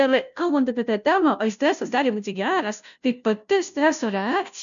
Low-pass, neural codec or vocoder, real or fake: 7.2 kHz; codec, 16 kHz, 0.5 kbps, FunCodec, trained on Chinese and English, 25 frames a second; fake